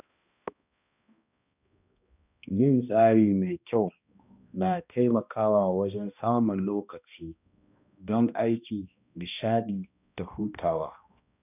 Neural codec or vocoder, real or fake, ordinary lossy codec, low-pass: codec, 16 kHz, 1 kbps, X-Codec, HuBERT features, trained on balanced general audio; fake; none; 3.6 kHz